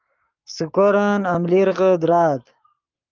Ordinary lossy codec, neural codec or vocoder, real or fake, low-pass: Opus, 24 kbps; codec, 44.1 kHz, 7.8 kbps, Pupu-Codec; fake; 7.2 kHz